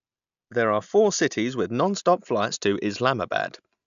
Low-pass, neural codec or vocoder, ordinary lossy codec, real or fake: 7.2 kHz; none; none; real